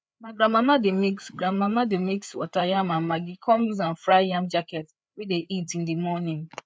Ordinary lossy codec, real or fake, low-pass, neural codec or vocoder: none; fake; none; codec, 16 kHz, 4 kbps, FreqCodec, larger model